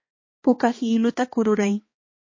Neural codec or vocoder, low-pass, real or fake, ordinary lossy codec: codec, 16 kHz, 2 kbps, X-Codec, HuBERT features, trained on balanced general audio; 7.2 kHz; fake; MP3, 32 kbps